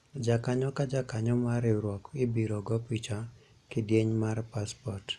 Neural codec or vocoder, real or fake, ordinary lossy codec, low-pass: none; real; none; none